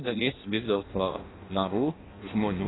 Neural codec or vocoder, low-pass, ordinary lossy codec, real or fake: codec, 16 kHz in and 24 kHz out, 0.6 kbps, FireRedTTS-2 codec; 7.2 kHz; AAC, 16 kbps; fake